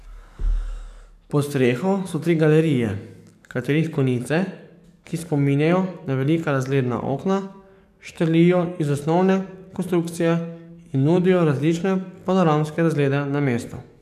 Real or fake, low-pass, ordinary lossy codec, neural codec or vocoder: fake; 14.4 kHz; none; codec, 44.1 kHz, 7.8 kbps, DAC